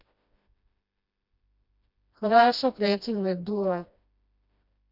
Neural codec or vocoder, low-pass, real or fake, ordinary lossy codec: codec, 16 kHz, 1 kbps, FreqCodec, smaller model; 5.4 kHz; fake; none